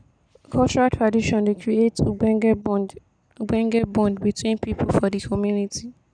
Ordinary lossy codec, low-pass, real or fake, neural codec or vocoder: none; 9.9 kHz; real; none